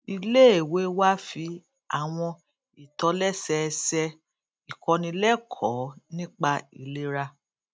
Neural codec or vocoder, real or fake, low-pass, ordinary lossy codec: none; real; none; none